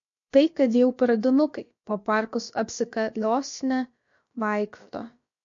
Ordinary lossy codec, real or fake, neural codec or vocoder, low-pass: MP3, 48 kbps; fake; codec, 16 kHz, about 1 kbps, DyCAST, with the encoder's durations; 7.2 kHz